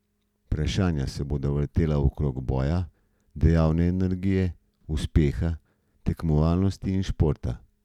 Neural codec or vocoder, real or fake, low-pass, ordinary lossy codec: none; real; 19.8 kHz; none